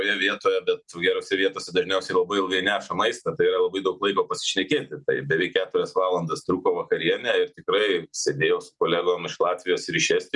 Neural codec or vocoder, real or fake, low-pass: none; real; 10.8 kHz